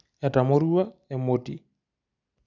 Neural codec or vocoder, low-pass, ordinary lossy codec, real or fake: none; 7.2 kHz; none; real